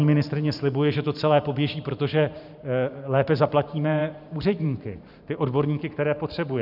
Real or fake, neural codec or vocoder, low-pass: fake; vocoder, 44.1 kHz, 128 mel bands every 512 samples, BigVGAN v2; 5.4 kHz